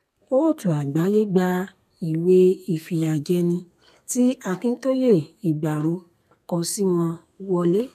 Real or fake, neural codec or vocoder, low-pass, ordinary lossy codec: fake; codec, 32 kHz, 1.9 kbps, SNAC; 14.4 kHz; none